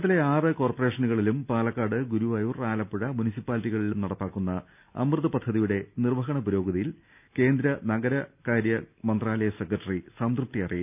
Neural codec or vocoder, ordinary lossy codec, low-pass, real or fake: none; none; 3.6 kHz; real